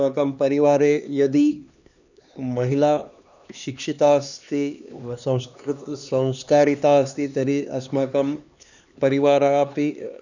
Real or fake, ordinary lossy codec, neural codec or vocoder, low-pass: fake; none; codec, 16 kHz, 2 kbps, X-Codec, HuBERT features, trained on LibriSpeech; 7.2 kHz